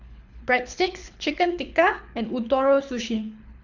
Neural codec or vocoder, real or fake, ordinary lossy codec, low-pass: codec, 24 kHz, 6 kbps, HILCodec; fake; none; 7.2 kHz